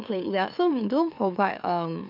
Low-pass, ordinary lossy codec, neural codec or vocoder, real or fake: 5.4 kHz; MP3, 48 kbps; autoencoder, 44.1 kHz, a latent of 192 numbers a frame, MeloTTS; fake